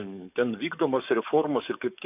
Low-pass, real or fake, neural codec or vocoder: 3.6 kHz; fake; codec, 44.1 kHz, 7.8 kbps, DAC